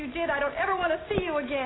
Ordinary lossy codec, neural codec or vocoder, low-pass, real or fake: AAC, 16 kbps; none; 7.2 kHz; real